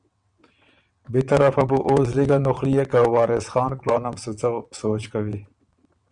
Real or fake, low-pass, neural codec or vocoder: fake; 9.9 kHz; vocoder, 22.05 kHz, 80 mel bands, WaveNeXt